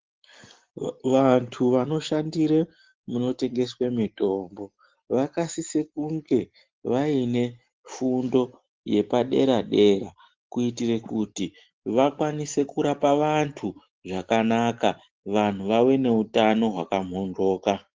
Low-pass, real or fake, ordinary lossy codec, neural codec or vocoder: 7.2 kHz; real; Opus, 16 kbps; none